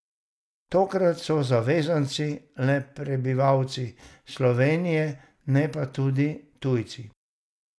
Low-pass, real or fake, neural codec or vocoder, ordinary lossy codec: none; real; none; none